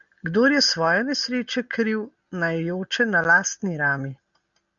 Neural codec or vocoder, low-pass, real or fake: none; 7.2 kHz; real